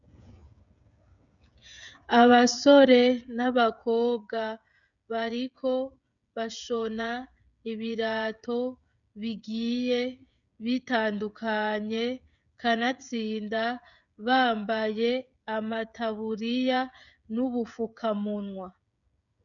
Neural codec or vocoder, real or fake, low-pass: codec, 16 kHz, 16 kbps, FreqCodec, smaller model; fake; 7.2 kHz